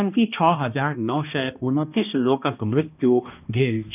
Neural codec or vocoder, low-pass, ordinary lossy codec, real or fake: codec, 16 kHz, 1 kbps, X-Codec, HuBERT features, trained on balanced general audio; 3.6 kHz; none; fake